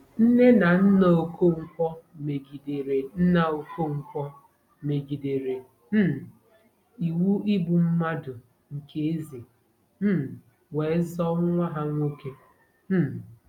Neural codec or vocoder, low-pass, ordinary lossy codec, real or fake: none; 19.8 kHz; none; real